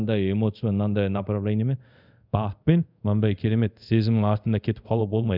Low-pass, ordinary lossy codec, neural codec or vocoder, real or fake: 5.4 kHz; none; codec, 24 kHz, 0.5 kbps, DualCodec; fake